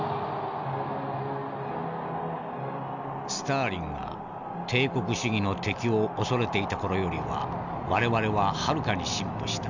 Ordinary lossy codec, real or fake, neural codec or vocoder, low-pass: none; real; none; 7.2 kHz